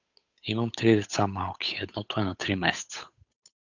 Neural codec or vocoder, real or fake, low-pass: codec, 16 kHz, 8 kbps, FunCodec, trained on Chinese and English, 25 frames a second; fake; 7.2 kHz